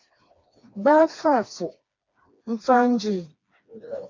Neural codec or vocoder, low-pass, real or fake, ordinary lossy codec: codec, 16 kHz, 2 kbps, FreqCodec, smaller model; 7.2 kHz; fake; AAC, 48 kbps